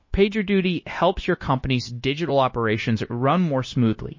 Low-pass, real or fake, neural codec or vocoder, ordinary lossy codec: 7.2 kHz; fake; codec, 16 kHz, 0.9 kbps, LongCat-Audio-Codec; MP3, 32 kbps